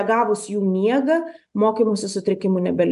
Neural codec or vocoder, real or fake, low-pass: none; real; 10.8 kHz